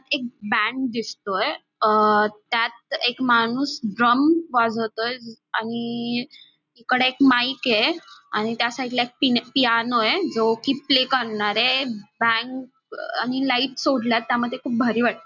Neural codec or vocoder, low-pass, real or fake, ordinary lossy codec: none; 7.2 kHz; real; none